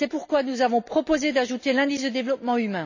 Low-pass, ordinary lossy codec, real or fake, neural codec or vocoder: 7.2 kHz; none; real; none